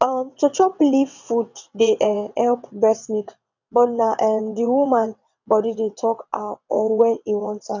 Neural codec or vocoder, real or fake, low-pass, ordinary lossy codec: vocoder, 22.05 kHz, 80 mel bands, WaveNeXt; fake; 7.2 kHz; none